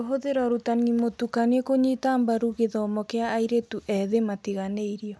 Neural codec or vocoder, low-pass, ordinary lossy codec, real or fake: none; none; none; real